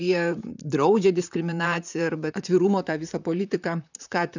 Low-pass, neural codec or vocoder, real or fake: 7.2 kHz; vocoder, 44.1 kHz, 128 mel bands, Pupu-Vocoder; fake